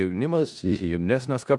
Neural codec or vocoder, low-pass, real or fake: codec, 16 kHz in and 24 kHz out, 0.4 kbps, LongCat-Audio-Codec, four codebook decoder; 10.8 kHz; fake